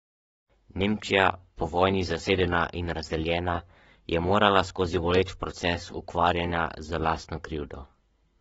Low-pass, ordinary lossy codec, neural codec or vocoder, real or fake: 19.8 kHz; AAC, 24 kbps; codec, 44.1 kHz, 7.8 kbps, Pupu-Codec; fake